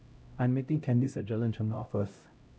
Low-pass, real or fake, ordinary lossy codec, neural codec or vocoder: none; fake; none; codec, 16 kHz, 0.5 kbps, X-Codec, HuBERT features, trained on LibriSpeech